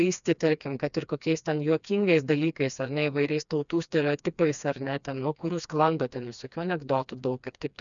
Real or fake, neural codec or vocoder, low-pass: fake; codec, 16 kHz, 2 kbps, FreqCodec, smaller model; 7.2 kHz